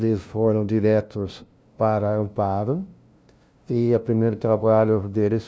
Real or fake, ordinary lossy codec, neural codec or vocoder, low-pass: fake; none; codec, 16 kHz, 0.5 kbps, FunCodec, trained on LibriTTS, 25 frames a second; none